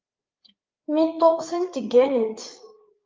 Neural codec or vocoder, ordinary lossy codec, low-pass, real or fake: codec, 16 kHz, 4 kbps, FreqCodec, larger model; Opus, 24 kbps; 7.2 kHz; fake